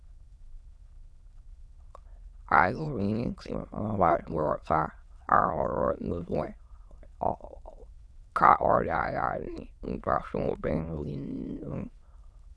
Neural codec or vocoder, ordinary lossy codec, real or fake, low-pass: autoencoder, 22.05 kHz, a latent of 192 numbers a frame, VITS, trained on many speakers; none; fake; none